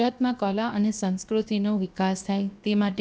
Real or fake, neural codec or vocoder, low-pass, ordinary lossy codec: fake; codec, 16 kHz, 0.7 kbps, FocalCodec; none; none